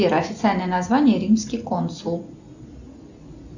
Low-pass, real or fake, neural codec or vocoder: 7.2 kHz; real; none